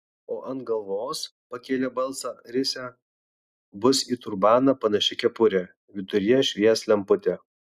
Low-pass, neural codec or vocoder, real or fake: 14.4 kHz; none; real